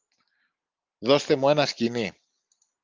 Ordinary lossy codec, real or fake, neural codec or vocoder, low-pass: Opus, 24 kbps; real; none; 7.2 kHz